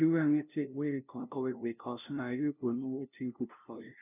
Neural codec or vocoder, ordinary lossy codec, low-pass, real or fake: codec, 16 kHz, 0.5 kbps, FunCodec, trained on LibriTTS, 25 frames a second; none; 3.6 kHz; fake